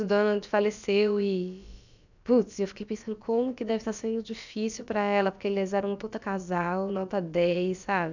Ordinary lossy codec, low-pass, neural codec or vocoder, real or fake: none; 7.2 kHz; codec, 16 kHz, about 1 kbps, DyCAST, with the encoder's durations; fake